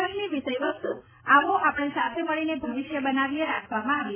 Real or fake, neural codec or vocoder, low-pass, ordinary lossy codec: real; none; 3.6 kHz; MP3, 16 kbps